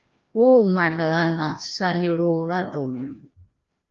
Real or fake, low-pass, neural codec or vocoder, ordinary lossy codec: fake; 7.2 kHz; codec, 16 kHz, 1 kbps, FreqCodec, larger model; Opus, 32 kbps